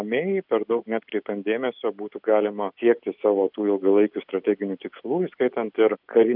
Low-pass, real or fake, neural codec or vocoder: 5.4 kHz; real; none